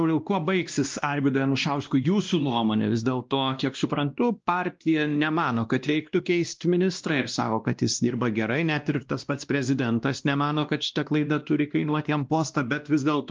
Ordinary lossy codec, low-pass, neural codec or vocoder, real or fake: Opus, 24 kbps; 7.2 kHz; codec, 16 kHz, 1 kbps, X-Codec, WavLM features, trained on Multilingual LibriSpeech; fake